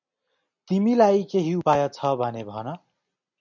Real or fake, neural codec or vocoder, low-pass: real; none; 7.2 kHz